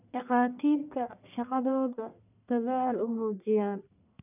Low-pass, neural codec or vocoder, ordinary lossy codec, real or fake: 3.6 kHz; codec, 44.1 kHz, 1.7 kbps, Pupu-Codec; none; fake